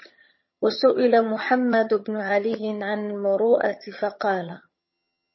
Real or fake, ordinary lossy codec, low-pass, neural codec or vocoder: fake; MP3, 24 kbps; 7.2 kHz; vocoder, 22.05 kHz, 80 mel bands, HiFi-GAN